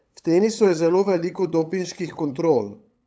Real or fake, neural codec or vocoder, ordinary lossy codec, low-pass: fake; codec, 16 kHz, 8 kbps, FunCodec, trained on LibriTTS, 25 frames a second; none; none